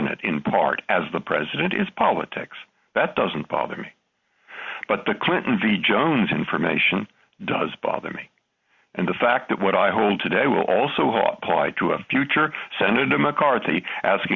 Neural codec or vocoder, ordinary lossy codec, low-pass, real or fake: none; Opus, 64 kbps; 7.2 kHz; real